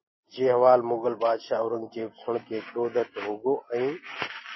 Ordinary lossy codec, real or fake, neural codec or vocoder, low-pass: MP3, 24 kbps; real; none; 7.2 kHz